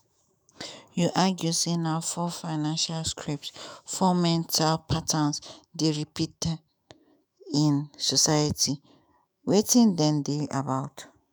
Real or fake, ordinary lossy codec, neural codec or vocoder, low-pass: fake; none; autoencoder, 48 kHz, 128 numbers a frame, DAC-VAE, trained on Japanese speech; none